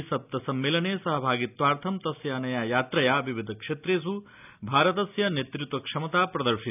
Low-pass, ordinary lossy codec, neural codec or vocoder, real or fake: 3.6 kHz; none; none; real